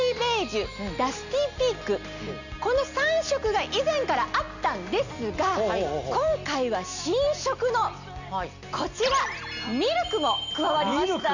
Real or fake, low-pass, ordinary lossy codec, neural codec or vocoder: real; 7.2 kHz; none; none